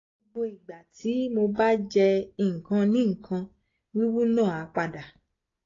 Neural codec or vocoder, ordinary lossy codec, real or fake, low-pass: none; AAC, 32 kbps; real; 7.2 kHz